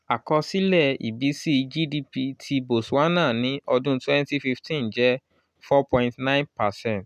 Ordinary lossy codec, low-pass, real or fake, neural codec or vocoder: none; 14.4 kHz; real; none